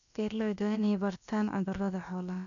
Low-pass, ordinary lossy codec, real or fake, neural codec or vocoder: 7.2 kHz; none; fake; codec, 16 kHz, about 1 kbps, DyCAST, with the encoder's durations